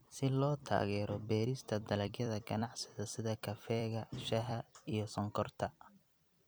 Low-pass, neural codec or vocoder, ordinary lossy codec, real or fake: none; vocoder, 44.1 kHz, 128 mel bands every 256 samples, BigVGAN v2; none; fake